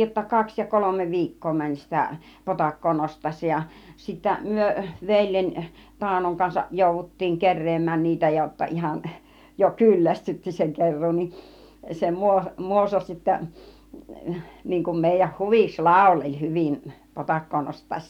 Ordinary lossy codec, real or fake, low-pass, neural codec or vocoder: none; real; 19.8 kHz; none